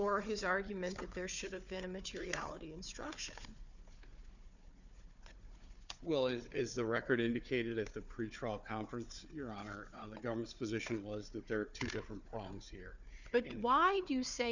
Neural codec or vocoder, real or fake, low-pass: codec, 16 kHz, 4 kbps, FunCodec, trained on Chinese and English, 50 frames a second; fake; 7.2 kHz